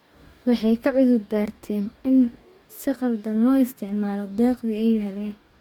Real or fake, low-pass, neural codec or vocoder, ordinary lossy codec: fake; 19.8 kHz; codec, 44.1 kHz, 2.6 kbps, DAC; MP3, 96 kbps